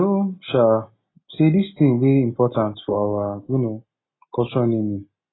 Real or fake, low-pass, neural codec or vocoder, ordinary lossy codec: real; 7.2 kHz; none; AAC, 16 kbps